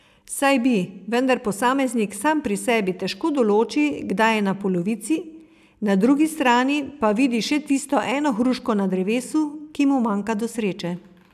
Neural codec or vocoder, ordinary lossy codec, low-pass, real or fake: none; none; 14.4 kHz; real